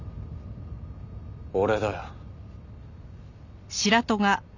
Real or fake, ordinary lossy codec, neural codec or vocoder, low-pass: real; none; none; 7.2 kHz